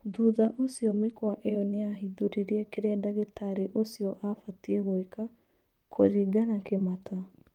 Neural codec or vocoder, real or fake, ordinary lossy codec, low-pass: vocoder, 44.1 kHz, 128 mel bands, Pupu-Vocoder; fake; Opus, 32 kbps; 19.8 kHz